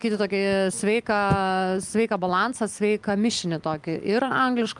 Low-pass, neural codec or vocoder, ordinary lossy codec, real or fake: 10.8 kHz; none; Opus, 32 kbps; real